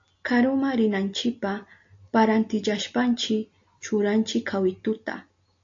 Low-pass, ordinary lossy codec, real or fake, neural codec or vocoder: 7.2 kHz; AAC, 48 kbps; real; none